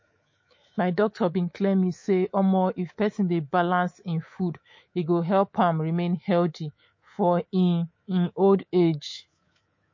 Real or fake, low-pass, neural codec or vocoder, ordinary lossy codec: fake; 7.2 kHz; codec, 24 kHz, 3.1 kbps, DualCodec; MP3, 32 kbps